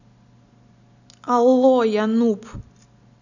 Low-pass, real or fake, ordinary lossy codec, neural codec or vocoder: 7.2 kHz; real; none; none